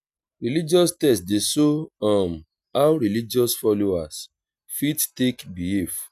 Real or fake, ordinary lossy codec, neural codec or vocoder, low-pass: real; none; none; 14.4 kHz